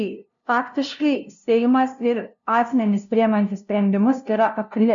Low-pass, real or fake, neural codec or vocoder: 7.2 kHz; fake; codec, 16 kHz, 0.5 kbps, FunCodec, trained on LibriTTS, 25 frames a second